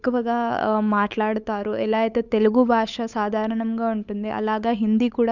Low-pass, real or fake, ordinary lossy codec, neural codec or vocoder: 7.2 kHz; fake; none; codec, 16 kHz, 8 kbps, FunCodec, trained on Chinese and English, 25 frames a second